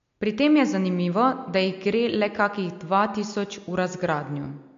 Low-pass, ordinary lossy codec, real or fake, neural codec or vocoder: 7.2 kHz; MP3, 48 kbps; real; none